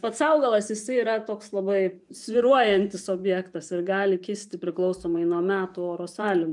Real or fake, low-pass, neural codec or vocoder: fake; 10.8 kHz; vocoder, 24 kHz, 100 mel bands, Vocos